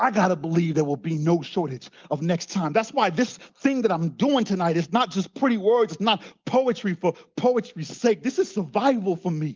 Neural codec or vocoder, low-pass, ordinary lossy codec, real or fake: none; 7.2 kHz; Opus, 32 kbps; real